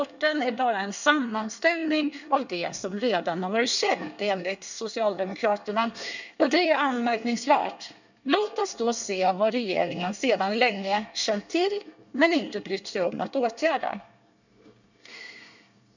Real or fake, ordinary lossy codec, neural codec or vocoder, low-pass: fake; none; codec, 24 kHz, 1 kbps, SNAC; 7.2 kHz